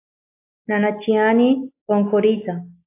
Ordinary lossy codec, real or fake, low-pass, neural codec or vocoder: AAC, 24 kbps; real; 3.6 kHz; none